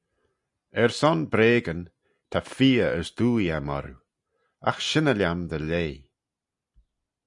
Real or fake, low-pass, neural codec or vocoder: real; 10.8 kHz; none